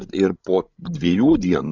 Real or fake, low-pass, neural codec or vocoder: fake; 7.2 kHz; codec, 16 kHz, 16 kbps, FreqCodec, larger model